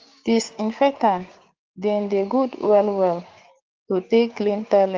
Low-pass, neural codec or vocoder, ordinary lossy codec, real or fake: 7.2 kHz; codec, 16 kHz, 6 kbps, DAC; Opus, 32 kbps; fake